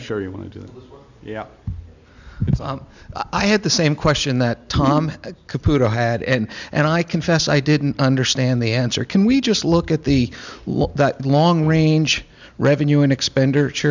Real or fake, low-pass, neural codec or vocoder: real; 7.2 kHz; none